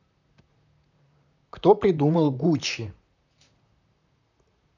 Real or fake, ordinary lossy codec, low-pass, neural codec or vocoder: fake; none; 7.2 kHz; vocoder, 44.1 kHz, 128 mel bands, Pupu-Vocoder